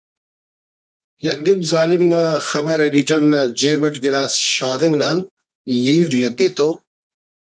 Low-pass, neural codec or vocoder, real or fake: 9.9 kHz; codec, 24 kHz, 0.9 kbps, WavTokenizer, medium music audio release; fake